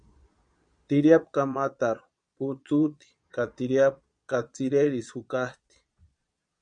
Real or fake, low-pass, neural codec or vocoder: fake; 9.9 kHz; vocoder, 22.05 kHz, 80 mel bands, Vocos